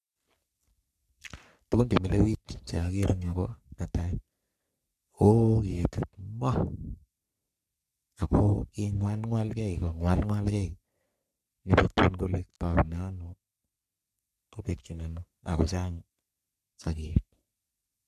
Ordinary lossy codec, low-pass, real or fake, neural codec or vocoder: none; 14.4 kHz; fake; codec, 44.1 kHz, 3.4 kbps, Pupu-Codec